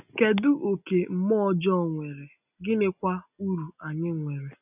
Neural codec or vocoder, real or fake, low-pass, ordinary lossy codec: none; real; 3.6 kHz; none